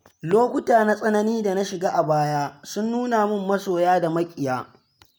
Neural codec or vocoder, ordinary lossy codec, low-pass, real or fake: none; none; none; real